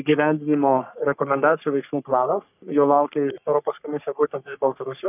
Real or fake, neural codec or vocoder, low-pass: fake; codec, 44.1 kHz, 3.4 kbps, Pupu-Codec; 3.6 kHz